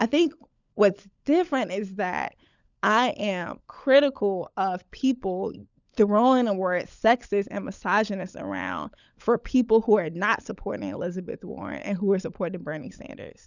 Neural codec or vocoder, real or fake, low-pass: codec, 16 kHz, 16 kbps, FunCodec, trained on LibriTTS, 50 frames a second; fake; 7.2 kHz